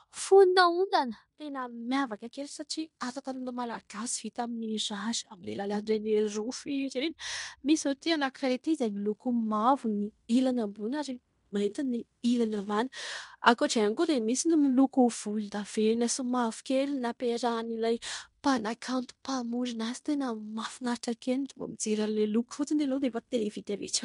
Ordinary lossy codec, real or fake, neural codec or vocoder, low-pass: MP3, 64 kbps; fake; codec, 16 kHz in and 24 kHz out, 0.9 kbps, LongCat-Audio-Codec, fine tuned four codebook decoder; 10.8 kHz